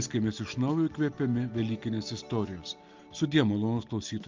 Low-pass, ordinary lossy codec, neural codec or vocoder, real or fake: 7.2 kHz; Opus, 24 kbps; none; real